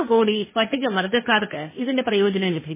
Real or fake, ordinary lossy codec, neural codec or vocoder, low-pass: fake; MP3, 16 kbps; codec, 16 kHz, 1.1 kbps, Voila-Tokenizer; 3.6 kHz